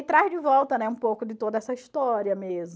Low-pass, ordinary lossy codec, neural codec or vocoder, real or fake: none; none; none; real